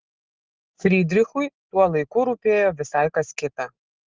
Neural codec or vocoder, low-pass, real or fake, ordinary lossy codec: none; 7.2 kHz; real; Opus, 16 kbps